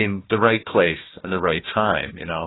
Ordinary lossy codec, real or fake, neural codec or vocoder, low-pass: AAC, 16 kbps; fake; codec, 16 kHz, 1 kbps, FunCodec, trained on Chinese and English, 50 frames a second; 7.2 kHz